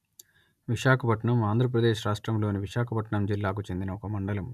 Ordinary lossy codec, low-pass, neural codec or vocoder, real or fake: none; 14.4 kHz; none; real